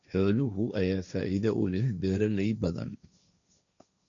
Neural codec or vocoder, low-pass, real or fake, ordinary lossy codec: codec, 16 kHz, 1.1 kbps, Voila-Tokenizer; 7.2 kHz; fake; none